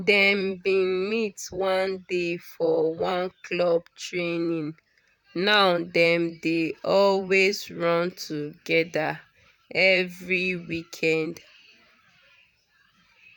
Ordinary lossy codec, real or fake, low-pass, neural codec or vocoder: none; fake; 19.8 kHz; vocoder, 44.1 kHz, 128 mel bands, Pupu-Vocoder